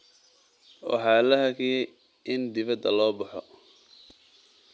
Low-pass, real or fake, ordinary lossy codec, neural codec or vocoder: none; real; none; none